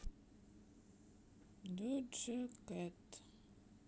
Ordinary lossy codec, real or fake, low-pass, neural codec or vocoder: none; real; none; none